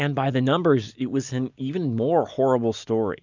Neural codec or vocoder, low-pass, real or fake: none; 7.2 kHz; real